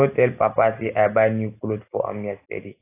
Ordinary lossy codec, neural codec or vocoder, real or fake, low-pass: AAC, 24 kbps; none; real; 3.6 kHz